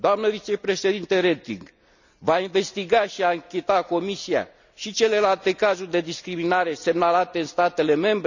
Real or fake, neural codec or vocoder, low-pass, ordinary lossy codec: real; none; 7.2 kHz; none